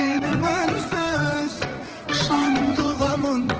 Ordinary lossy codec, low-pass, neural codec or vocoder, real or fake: Opus, 16 kbps; 7.2 kHz; vocoder, 44.1 kHz, 80 mel bands, Vocos; fake